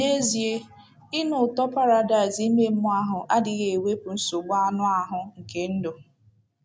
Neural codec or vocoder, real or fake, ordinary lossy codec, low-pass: none; real; none; none